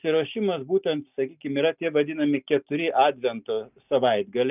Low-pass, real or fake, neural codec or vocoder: 3.6 kHz; real; none